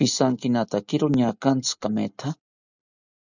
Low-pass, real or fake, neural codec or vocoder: 7.2 kHz; real; none